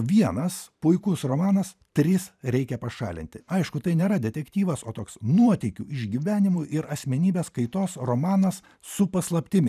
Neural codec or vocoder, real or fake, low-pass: vocoder, 44.1 kHz, 128 mel bands every 512 samples, BigVGAN v2; fake; 14.4 kHz